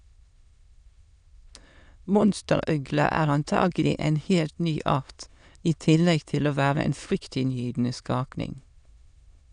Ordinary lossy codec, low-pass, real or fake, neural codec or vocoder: none; 9.9 kHz; fake; autoencoder, 22.05 kHz, a latent of 192 numbers a frame, VITS, trained on many speakers